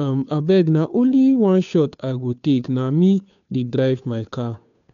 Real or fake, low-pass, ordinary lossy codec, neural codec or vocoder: fake; 7.2 kHz; none; codec, 16 kHz, 2 kbps, FunCodec, trained on Chinese and English, 25 frames a second